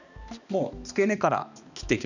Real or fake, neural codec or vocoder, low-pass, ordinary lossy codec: fake; codec, 16 kHz, 2 kbps, X-Codec, HuBERT features, trained on balanced general audio; 7.2 kHz; none